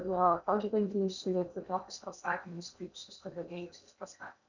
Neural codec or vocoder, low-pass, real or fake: codec, 16 kHz in and 24 kHz out, 0.8 kbps, FocalCodec, streaming, 65536 codes; 7.2 kHz; fake